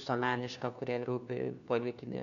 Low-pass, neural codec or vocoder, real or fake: 7.2 kHz; codec, 16 kHz, 1 kbps, FunCodec, trained on LibriTTS, 50 frames a second; fake